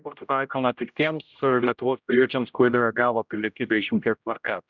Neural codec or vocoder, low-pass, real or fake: codec, 16 kHz, 0.5 kbps, X-Codec, HuBERT features, trained on general audio; 7.2 kHz; fake